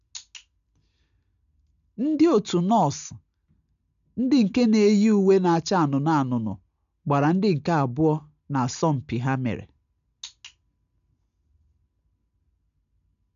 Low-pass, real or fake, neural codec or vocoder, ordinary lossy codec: 7.2 kHz; real; none; none